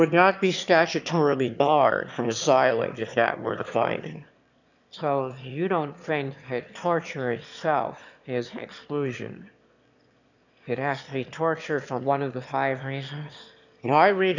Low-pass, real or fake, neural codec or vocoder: 7.2 kHz; fake; autoencoder, 22.05 kHz, a latent of 192 numbers a frame, VITS, trained on one speaker